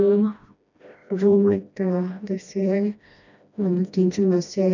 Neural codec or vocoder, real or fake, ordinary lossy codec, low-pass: codec, 16 kHz, 1 kbps, FreqCodec, smaller model; fake; none; 7.2 kHz